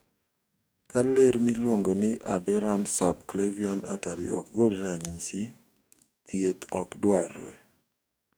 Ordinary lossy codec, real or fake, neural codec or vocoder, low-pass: none; fake; codec, 44.1 kHz, 2.6 kbps, DAC; none